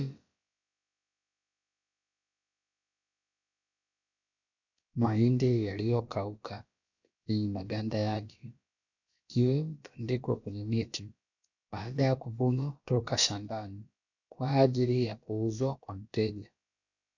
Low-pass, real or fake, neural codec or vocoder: 7.2 kHz; fake; codec, 16 kHz, about 1 kbps, DyCAST, with the encoder's durations